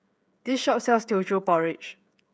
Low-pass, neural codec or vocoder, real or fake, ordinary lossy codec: none; codec, 16 kHz, 16 kbps, FreqCodec, smaller model; fake; none